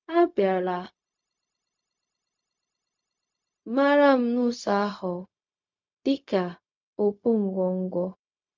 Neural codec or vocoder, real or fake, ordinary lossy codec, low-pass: codec, 16 kHz, 0.4 kbps, LongCat-Audio-Codec; fake; MP3, 64 kbps; 7.2 kHz